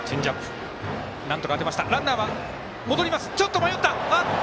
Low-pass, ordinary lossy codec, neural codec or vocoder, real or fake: none; none; none; real